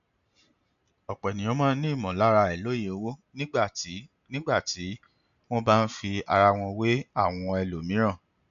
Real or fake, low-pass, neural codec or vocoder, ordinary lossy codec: real; 7.2 kHz; none; none